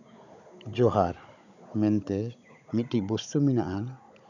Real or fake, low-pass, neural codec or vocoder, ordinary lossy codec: fake; 7.2 kHz; codec, 16 kHz, 16 kbps, FunCodec, trained on Chinese and English, 50 frames a second; none